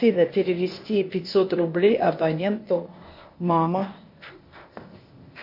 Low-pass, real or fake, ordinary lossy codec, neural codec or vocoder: 5.4 kHz; fake; MP3, 32 kbps; codec, 16 kHz, 0.8 kbps, ZipCodec